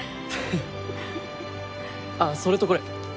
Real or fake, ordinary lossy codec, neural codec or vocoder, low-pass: real; none; none; none